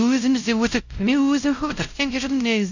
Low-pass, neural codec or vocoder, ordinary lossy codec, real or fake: 7.2 kHz; codec, 16 kHz, 0.5 kbps, X-Codec, WavLM features, trained on Multilingual LibriSpeech; none; fake